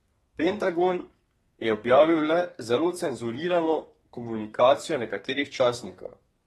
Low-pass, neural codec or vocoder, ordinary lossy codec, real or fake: 14.4 kHz; codec, 32 kHz, 1.9 kbps, SNAC; AAC, 32 kbps; fake